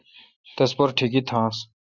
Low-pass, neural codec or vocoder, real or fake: 7.2 kHz; none; real